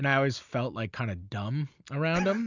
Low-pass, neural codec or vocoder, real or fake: 7.2 kHz; none; real